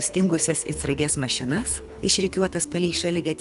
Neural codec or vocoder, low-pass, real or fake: codec, 24 kHz, 3 kbps, HILCodec; 10.8 kHz; fake